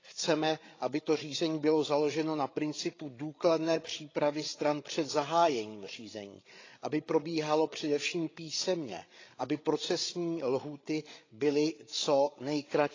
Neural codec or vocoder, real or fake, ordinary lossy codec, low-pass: codec, 16 kHz, 8 kbps, FreqCodec, larger model; fake; AAC, 32 kbps; 7.2 kHz